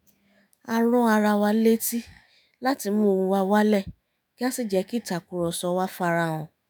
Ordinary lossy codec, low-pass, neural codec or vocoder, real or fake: none; none; autoencoder, 48 kHz, 128 numbers a frame, DAC-VAE, trained on Japanese speech; fake